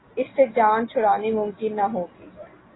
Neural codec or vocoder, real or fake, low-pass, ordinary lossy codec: none; real; 7.2 kHz; AAC, 16 kbps